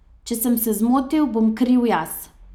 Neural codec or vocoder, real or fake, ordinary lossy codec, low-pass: none; real; none; 19.8 kHz